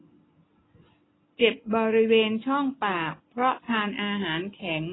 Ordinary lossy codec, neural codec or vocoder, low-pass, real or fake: AAC, 16 kbps; none; 7.2 kHz; real